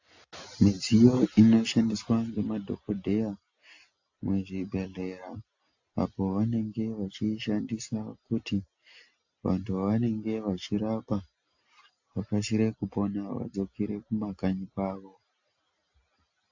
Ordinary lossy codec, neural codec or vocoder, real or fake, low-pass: AAC, 48 kbps; none; real; 7.2 kHz